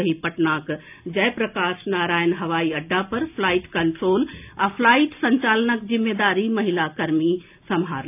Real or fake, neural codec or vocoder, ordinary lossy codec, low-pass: real; none; AAC, 32 kbps; 3.6 kHz